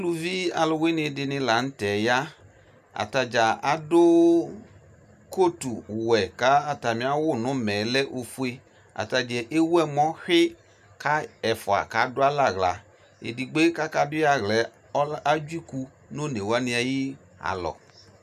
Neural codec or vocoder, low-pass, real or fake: none; 14.4 kHz; real